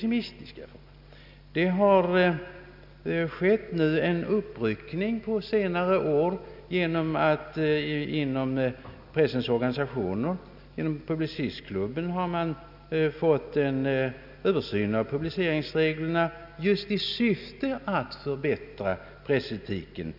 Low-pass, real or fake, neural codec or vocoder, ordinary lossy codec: 5.4 kHz; real; none; none